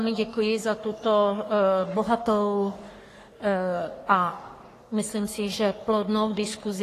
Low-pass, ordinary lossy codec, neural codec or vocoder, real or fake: 14.4 kHz; AAC, 48 kbps; codec, 44.1 kHz, 3.4 kbps, Pupu-Codec; fake